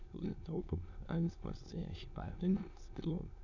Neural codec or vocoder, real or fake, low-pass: autoencoder, 22.05 kHz, a latent of 192 numbers a frame, VITS, trained on many speakers; fake; 7.2 kHz